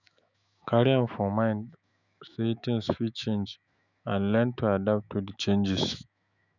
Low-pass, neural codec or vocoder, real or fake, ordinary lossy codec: 7.2 kHz; autoencoder, 48 kHz, 128 numbers a frame, DAC-VAE, trained on Japanese speech; fake; none